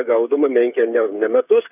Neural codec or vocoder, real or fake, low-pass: codec, 16 kHz, 8 kbps, FreqCodec, smaller model; fake; 3.6 kHz